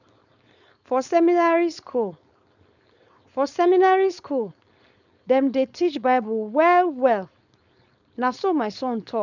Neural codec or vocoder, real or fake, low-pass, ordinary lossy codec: codec, 16 kHz, 4.8 kbps, FACodec; fake; 7.2 kHz; none